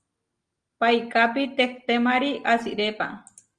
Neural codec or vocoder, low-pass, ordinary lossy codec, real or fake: none; 9.9 kHz; Opus, 24 kbps; real